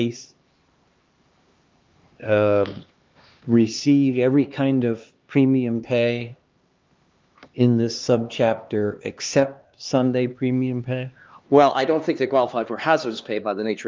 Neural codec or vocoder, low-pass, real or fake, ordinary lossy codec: codec, 16 kHz, 2 kbps, X-Codec, HuBERT features, trained on LibriSpeech; 7.2 kHz; fake; Opus, 32 kbps